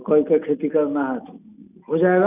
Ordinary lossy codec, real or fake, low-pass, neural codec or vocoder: none; real; 3.6 kHz; none